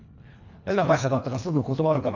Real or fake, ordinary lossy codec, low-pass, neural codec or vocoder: fake; none; 7.2 kHz; codec, 24 kHz, 1.5 kbps, HILCodec